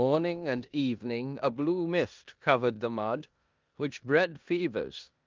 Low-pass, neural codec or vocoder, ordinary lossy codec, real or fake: 7.2 kHz; codec, 16 kHz in and 24 kHz out, 0.9 kbps, LongCat-Audio-Codec, four codebook decoder; Opus, 24 kbps; fake